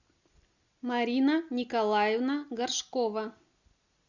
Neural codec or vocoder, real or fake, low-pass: none; real; 7.2 kHz